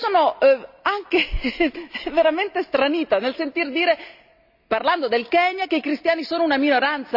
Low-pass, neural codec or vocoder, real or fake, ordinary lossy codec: 5.4 kHz; none; real; AAC, 48 kbps